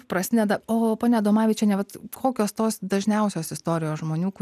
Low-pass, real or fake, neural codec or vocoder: 14.4 kHz; real; none